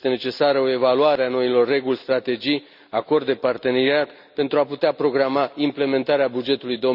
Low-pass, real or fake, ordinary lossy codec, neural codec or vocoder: 5.4 kHz; real; none; none